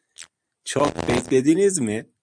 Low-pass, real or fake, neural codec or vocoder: 9.9 kHz; fake; vocoder, 44.1 kHz, 128 mel bands every 256 samples, BigVGAN v2